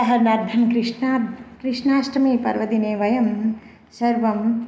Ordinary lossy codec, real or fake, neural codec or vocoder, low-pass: none; real; none; none